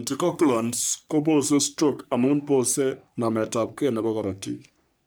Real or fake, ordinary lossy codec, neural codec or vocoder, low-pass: fake; none; codec, 44.1 kHz, 3.4 kbps, Pupu-Codec; none